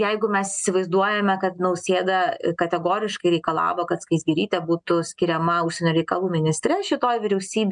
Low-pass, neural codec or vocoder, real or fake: 9.9 kHz; none; real